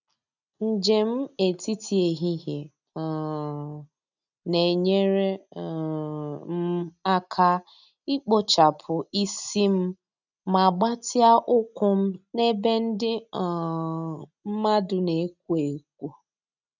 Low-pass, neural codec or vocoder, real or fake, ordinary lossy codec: 7.2 kHz; none; real; none